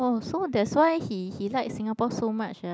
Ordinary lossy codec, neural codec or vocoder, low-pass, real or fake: none; none; none; real